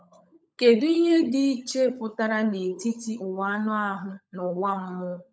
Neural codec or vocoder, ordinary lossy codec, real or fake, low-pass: codec, 16 kHz, 16 kbps, FunCodec, trained on LibriTTS, 50 frames a second; none; fake; none